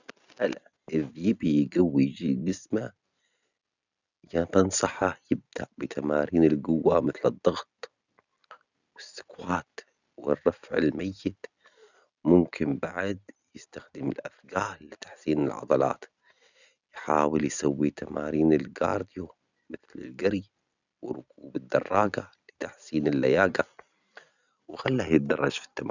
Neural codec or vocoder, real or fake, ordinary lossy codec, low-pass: none; real; none; 7.2 kHz